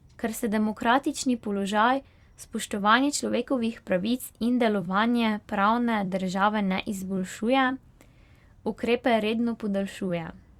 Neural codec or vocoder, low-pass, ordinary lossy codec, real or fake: vocoder, 44.1 kHz, 128 mel bands every 256 samples, BigVGAN v2; 19.8 kHz; Opus, 64 kbps; fake